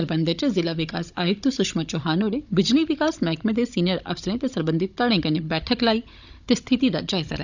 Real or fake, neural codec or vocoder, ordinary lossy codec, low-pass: fake; codec, 16 kHz, 16 kbps, FunCodec, trained on Chinese and English, 50 frames a second; none; 7.2 kHz